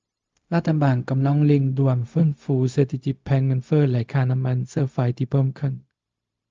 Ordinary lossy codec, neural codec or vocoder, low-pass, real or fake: Opus, 24 kbps; codec, 16 kHz, 0.4 kbps, LongCat-Audio-Codec; 7.2 kHz; fake